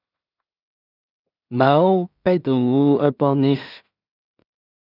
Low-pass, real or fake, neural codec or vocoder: 5.4 kHz; fake; codec, 16 kHz in and 24 kHz out, 0.4 kbps, LongCat-Audio-Codec, two codebook decoder